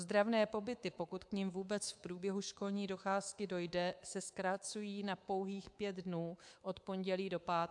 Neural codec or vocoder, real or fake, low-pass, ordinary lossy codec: codec, 24 kHz, 3.1 kbps, DualCodec; fake; 10.8 kHz; AAC, 64 kbps